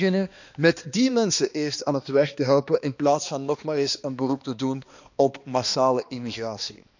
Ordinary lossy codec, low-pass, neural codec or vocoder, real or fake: none; 7.2 kHz; codec, 16 kHz, 2 kbps, X-Codec, HuBERT features, trained on balanced general audio; fake